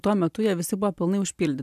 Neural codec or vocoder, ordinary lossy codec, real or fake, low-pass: none; MP3, 96 kbps; real; 14.4 kHz